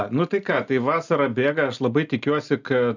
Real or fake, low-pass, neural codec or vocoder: real; 7.2 kHz; none